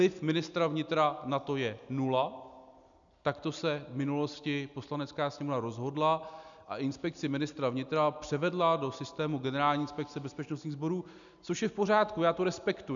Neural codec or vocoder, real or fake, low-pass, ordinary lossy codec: none; real; 7.2 kHz; MP3, 96 kbps